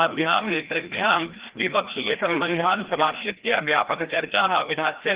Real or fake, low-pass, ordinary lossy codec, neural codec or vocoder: fake; 3.6 kHz; Opus, 16 kbps; codec, 16 kHz, 1 kbps, FreqCodec, larger model